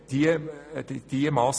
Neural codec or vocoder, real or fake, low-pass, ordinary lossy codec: none; real; none; none